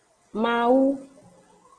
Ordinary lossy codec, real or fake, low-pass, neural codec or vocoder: Opus, 16 kbps; real; 9.9 kHz; none